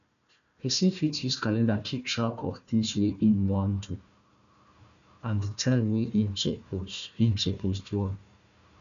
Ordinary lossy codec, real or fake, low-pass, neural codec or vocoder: none; fake; 7.2 kHz; codec, 16 kHz, 1 kbps, FunCodec, trained on Chinese and English, 50 frames a second